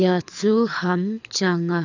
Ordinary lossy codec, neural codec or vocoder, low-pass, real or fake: none; codec, 24 kHz, 6 kbps, HILCodec; 7.2 kHz; fake